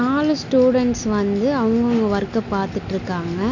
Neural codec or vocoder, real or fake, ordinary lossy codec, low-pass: none; real; none; 7.2 kHz